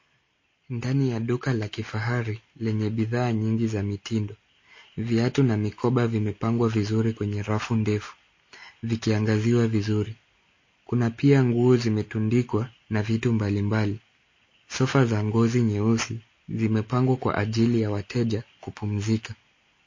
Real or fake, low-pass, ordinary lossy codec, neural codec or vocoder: real; 7.2 kHz; MP3, 32 kbps; none